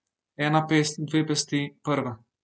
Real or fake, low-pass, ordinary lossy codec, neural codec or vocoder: real; none; none; none